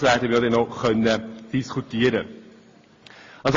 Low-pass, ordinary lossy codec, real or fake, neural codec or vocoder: 7.2 kHz; AAC, 32 kbps; real; none